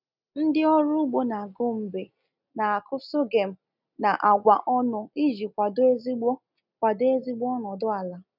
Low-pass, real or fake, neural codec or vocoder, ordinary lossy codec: 5.4 kHz; real; none; none